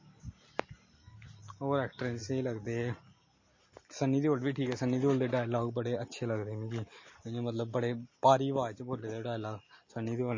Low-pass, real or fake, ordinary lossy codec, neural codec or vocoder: 7.2 kHz; real; MP3, 32 kbps; none